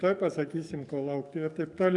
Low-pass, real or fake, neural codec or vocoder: 10.8 kHz; fake; codec, 44.1 kHz, 7.8 kbps, DAC